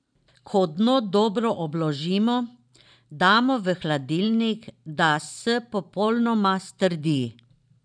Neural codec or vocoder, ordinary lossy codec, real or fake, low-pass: vocoder, 44.1 kHz, 128 mel bands every 512 samples, BigVGAN v2; none; fake; 9.9 kHz